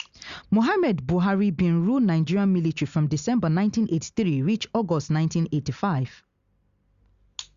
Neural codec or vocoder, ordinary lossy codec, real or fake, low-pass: none; Opus, 64 kbps; real; 7.2 kHz